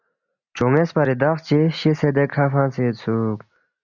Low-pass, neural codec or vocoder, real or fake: 7.2 kHz; none; real